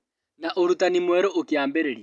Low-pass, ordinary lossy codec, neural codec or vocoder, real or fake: none; none; none; real